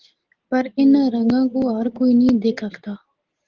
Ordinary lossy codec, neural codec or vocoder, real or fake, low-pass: Opus, 16 kbps; none; real; 7.2 kHz